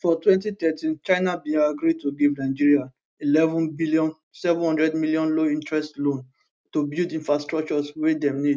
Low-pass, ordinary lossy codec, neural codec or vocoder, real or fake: none; none; none; real